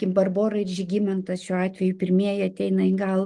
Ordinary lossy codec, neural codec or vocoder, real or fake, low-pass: Opus, 24 kbps; none; real; 10.8 kHz